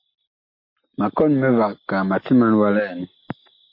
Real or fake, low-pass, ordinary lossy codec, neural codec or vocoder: real; 5.4 kHz; MP3, 32 kbps; none